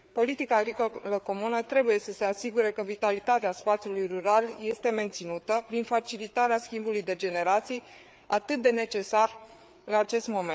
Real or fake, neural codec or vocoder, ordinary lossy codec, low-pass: fake; codec, 16 kHz, 4 kbps, FreqCodec, larger model; none; none